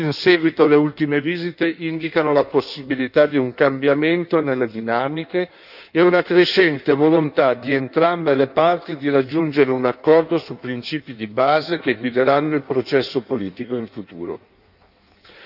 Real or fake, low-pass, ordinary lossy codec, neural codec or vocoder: fake; 5.4 kHz; none; codec, 16 kHz in and 24 kHz out, 1.1 kbps, FireRedTTS-2 codec